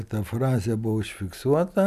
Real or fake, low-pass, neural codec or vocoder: real; 14.4 kHz; none